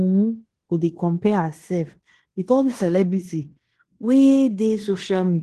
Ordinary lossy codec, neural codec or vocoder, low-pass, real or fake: Opus, 16 kbps; codec, 16 kHz in and 24 kHz out, 0.9 kbps, LongCat-Audio-Codec, fine tuned four codebook decoder; 10.8 kHz; fake